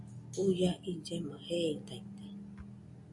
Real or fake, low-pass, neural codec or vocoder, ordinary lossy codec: real; 10.8 kHz; none; AAC, 64 kbps